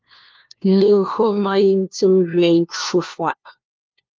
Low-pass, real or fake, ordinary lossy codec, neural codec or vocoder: 7.2 kHz; fake; Opus, 24 kbps; codec, 16 kHz, 1 kbps, FunCodec, trained on LibriTTS, 50 frames a second